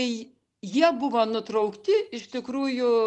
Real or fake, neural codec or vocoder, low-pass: real; none; 10.8 kHz